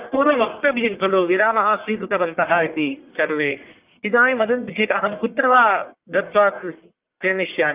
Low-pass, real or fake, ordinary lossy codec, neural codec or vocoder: 3.6 kHz; fake; Opus, 24 kbps; codec, 44.1 kHz, 1.7 kbps, Pupu-Codec